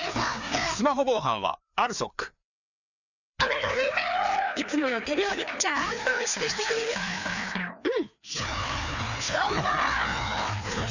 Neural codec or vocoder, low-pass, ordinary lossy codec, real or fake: codec, 16 kHz, 2 kbps, FreqCodec, larger model; 7.2 kHz; none; fake